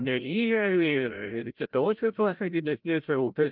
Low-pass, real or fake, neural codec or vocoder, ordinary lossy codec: 5.4 kHz; fake; codec, 16 kHz, 0.5 kbps, FreqCodec, larger model; Opus, 24 kbps